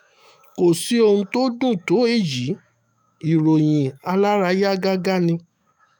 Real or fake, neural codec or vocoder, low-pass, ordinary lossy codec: fake; autoencoder, 48 kHz, 128 numbers a frame, DAC-VAE, trained on Japanese speech; none; none